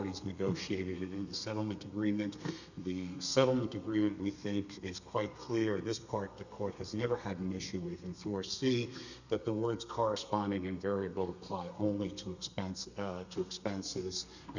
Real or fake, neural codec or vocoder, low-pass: fake; codec, 32 kHz, 1.9 kbps, SNAC; 7.2 kHz